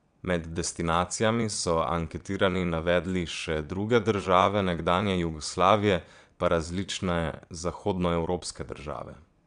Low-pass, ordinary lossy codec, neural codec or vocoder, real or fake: 9.9 kHz; none; vocoder, 22.05 kHz, 80 mel bands, Vocos; fake